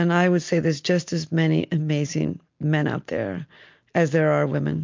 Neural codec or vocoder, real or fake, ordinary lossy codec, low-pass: none; real; MP3, 48 kbps; 7.2 kHz